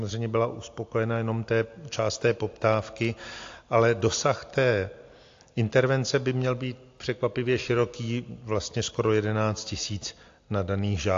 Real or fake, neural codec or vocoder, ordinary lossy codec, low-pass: real; none; AAC, 48 kbps; 7.2 kHz